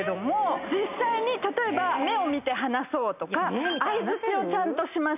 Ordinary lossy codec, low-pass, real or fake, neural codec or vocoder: none; 3.6 kHz; real; none